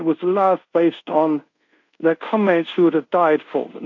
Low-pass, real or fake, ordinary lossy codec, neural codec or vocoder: 7.2 kHz; fake; AAC, 48 kbps; codec, 24 kHz, 0.5 kbps, DualCodec